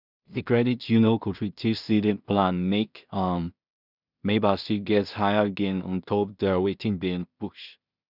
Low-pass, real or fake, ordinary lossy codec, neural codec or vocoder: 5.4 kHz; fake; none; codec, 16 kHz in and 24 kHz out, 0.4 kbps, LongCat-Audio-Codec, two codebook decoder